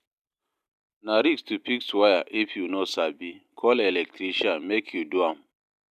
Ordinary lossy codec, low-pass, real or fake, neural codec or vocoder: none; 14.4 kHz; real; none